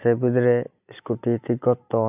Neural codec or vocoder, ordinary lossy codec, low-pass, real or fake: none; AAC, 32 kbps; 3.6 kHz; real